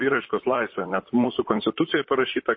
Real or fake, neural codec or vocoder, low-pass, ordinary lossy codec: fake; vocoder, 44.1 kHz, 128 mel bands, Pupu-Vocoder; 7.2 kHz; MP3, 24 kbps